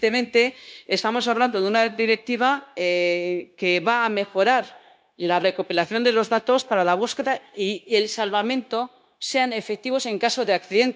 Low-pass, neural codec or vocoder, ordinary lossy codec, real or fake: none; codec, 16 kHz, 0.9 kbps, LongCat-Audio-Codec; none; fake